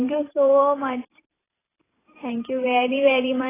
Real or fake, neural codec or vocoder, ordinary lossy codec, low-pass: fake; vocoder, 44.1 kHz, 128 mel bands every 512 samples, BigVGAN v2; AAC, 16 kbps; 3.6 kHz